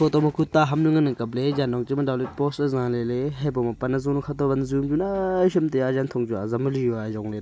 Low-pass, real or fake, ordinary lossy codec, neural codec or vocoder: none; real; none; none